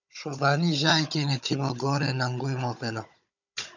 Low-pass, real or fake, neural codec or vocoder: 7.2 kHz; fake; codec, 16 kHz, 16 kbps, FunCodec, trained on Chinese and English, 50 frames a second